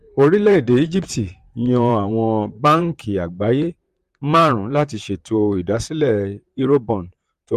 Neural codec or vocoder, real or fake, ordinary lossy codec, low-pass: vocoder, 44.1 kHz, 128 mel bands, Pupu-Vocoder; fake; Opus, 32 kbps; 14.4 kHz